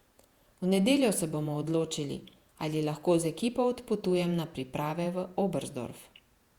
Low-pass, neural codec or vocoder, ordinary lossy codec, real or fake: 19.8 kHz; vocoder, 48 kHz, 128 mel bands, Vocos; Opus, 64 kbps; fake